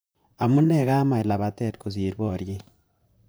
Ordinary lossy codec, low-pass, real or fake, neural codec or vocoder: none; none; fake; vocoder, 44.1 kHz, 128 mel bands, Pupu-Vocoder